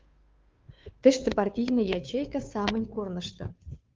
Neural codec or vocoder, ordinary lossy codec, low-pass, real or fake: codec, 16 kHz, 2 kbps, FunCodec, trained on Chinese and English, 25 frames a second; Opus, 24 kbps; 7.2 kHz; fake